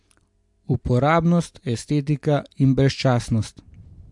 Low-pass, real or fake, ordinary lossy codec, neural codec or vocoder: 10.8 kHz; real; MP3, 64 kbps; none